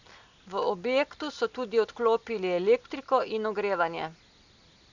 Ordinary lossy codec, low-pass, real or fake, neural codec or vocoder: none; 7.2 kHz; real; none